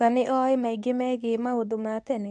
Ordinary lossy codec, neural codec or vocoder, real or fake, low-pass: none; codec, 24 kHz, 0.9 kbps, WavTokenizer, medium speech release version 2; fake; none